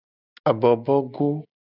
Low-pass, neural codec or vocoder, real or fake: 5.4 kHz; none; real